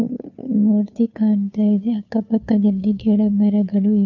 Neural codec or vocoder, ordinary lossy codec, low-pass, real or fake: codec, 16 kHz, 2 kbps, FunCodec, trained on Chinese and English, 25 frames a second; none; 7.2 kHz; fake